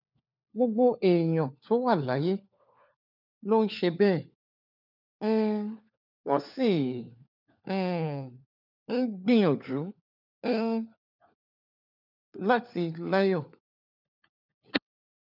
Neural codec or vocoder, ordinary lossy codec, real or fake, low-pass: codec, 16 kHz, 4 kbps, FunCodec, trained on LibriTTS, 50 frames a second; none; fake; 5.4 kHz